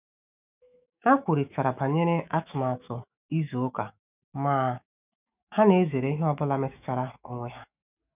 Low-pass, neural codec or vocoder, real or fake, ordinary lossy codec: 3.6 kHz; autoencoder, 48 kHz, 128 numbers a frame, DAC-VAE, trained on Japanese speech; fake; none